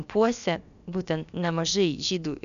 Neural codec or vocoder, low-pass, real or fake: codec, 16 kHz, about 1 kbps, DyCAST, with the encoder's durations; 7.2 kHz; fake